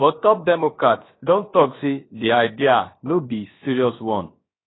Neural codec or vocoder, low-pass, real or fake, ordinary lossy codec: codec, 16 kHz, about 1 kbps, DyCAST, with the encoder's durations; 7.2 kHz; fake; AAC, 16 kbps